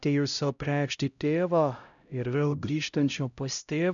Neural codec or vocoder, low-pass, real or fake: codec, 16 kHz, 0.5 kbps, X-Codec, HuBERT features, trained on LibriSpeech; 7.2 kHz; fake